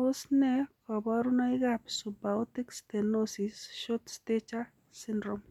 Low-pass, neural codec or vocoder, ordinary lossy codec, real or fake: 19.8 kHz; none; none; real